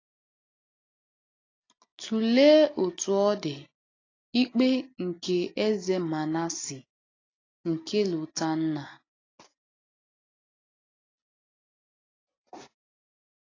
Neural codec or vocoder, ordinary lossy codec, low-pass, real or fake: none; AAC, 32 kbps; 7.2 kHz; real